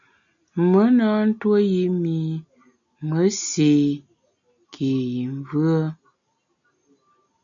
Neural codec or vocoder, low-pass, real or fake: none; 7.2 kHz; real